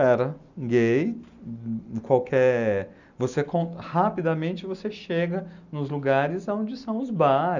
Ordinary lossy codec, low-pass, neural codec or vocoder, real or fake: none; 7.2 kHz; none; real